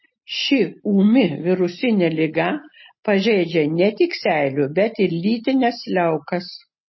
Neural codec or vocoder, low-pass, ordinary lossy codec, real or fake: none; 7.2 kHz; MP3, 24 kbps; real